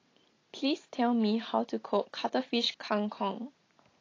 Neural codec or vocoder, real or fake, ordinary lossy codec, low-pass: none; real; AAC, 32 kbps; 7.2 kHz